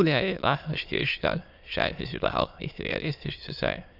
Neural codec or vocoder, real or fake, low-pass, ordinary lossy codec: autoencoder, 22.05 kHz, a latent of 192 numbers a frame, VITS, trained on many speakers; fake; 5.4 kHz; none